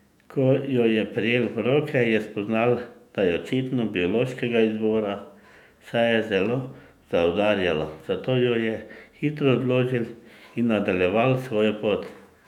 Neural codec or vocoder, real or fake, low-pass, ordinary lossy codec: autoencoder, 48 kHz, 128 numbers a frame, DAC-VAE, trained on Japanese speech; fake; 19.8 kHz; none